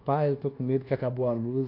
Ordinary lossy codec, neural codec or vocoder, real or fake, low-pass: AAC, 32 kbps; codec, 24 kHz, 1.2 kbps, DualCodec; fake; 5.4 kHz